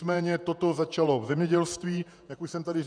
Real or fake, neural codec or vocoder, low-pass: fake; vocoder, 48 kHz, 128 mel bands, Vocos; 9.9 kHz